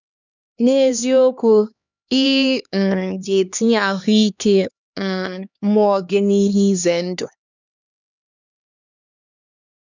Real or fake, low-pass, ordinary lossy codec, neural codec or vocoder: fake; 7.2 kHz; none; codec, 16 kHz, 2 kbps, X-Codec, HuBERT features, trained on LibriSpeech